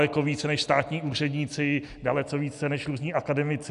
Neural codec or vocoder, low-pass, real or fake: none; 10.8 kHz; real